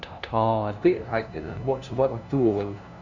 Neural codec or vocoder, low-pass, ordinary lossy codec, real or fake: codec, 16 kHz, 0.5 kbps, FunCodec, trained on LibriTTS, 25 frames a second; 7.2 kHz; none; fake